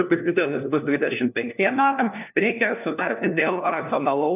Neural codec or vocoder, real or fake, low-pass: codec, 16 kHz, 1 kbps, FunCodec, trained on LibriTTS, 50 frames a second; fake; 3.6 kHz